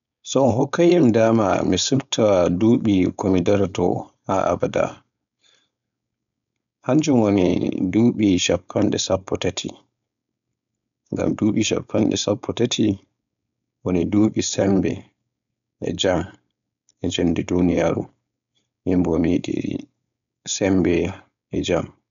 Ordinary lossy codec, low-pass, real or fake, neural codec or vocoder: none; 7.2 kHz; fake; codec, 16 kHz, 4.8 kbps, FACodec